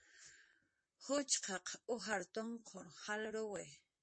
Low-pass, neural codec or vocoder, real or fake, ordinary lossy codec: 9.9 kHz; vocoder, 44.1 kHz, 128 mel bands, Pupu-Vocoder; fake; MP3, 32 kbps